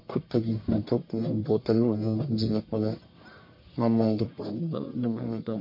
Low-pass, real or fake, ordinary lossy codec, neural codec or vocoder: 5.4 kHz; fake; MP3, 32 kbps; codec, 44.1 kHz, 1.7 kbps, Pupu-Codec